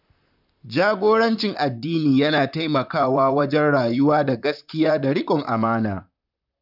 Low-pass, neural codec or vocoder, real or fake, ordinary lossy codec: 5.4 kHz; vocoder, 44.1 kHz, 128 mel bands, Pupu-Vocoder; fake; none